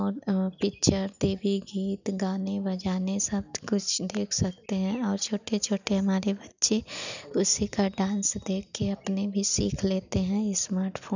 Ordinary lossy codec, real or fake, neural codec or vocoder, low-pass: none; real; none; 7.2 kHz